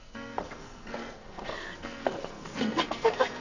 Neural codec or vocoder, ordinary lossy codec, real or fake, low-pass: codec, 44.1 kHz, 2.6 kbps, SNAC; none; fake; 7.2 kHz